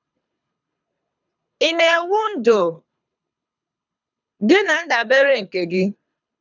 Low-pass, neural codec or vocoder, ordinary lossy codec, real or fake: 7.2 kHz; codec, 24 kHz, 3 kbps, HILCodec; none; fake